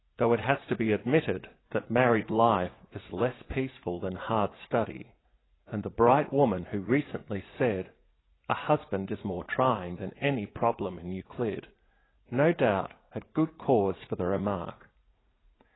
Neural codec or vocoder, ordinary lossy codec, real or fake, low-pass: vocoder, 44.1 kHz, 80 mel bands, Vocos; AAC, 16 kbps; fake; 7.2 kHz